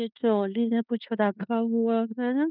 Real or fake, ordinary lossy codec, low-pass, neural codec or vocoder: fake; none; 5.4 kHz; codec, 16 kHz in and 24 kHz out, 0.9 kbps, LongCat-Audio-Codec, fine tuned four codebook decoder